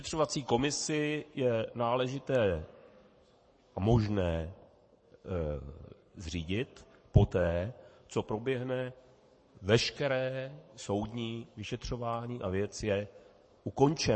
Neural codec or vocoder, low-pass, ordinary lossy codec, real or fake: codec, 44.1 kHz, 7.8 kbps, Pupu-Codec; 9.9 kHz; MP3, 32 kbps; fake